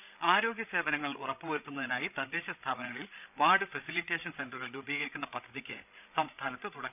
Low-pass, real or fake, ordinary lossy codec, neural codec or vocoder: 3.6 kHz; fake; none; codec, 16 kHz, 16 kbps, FreqCodec, larger model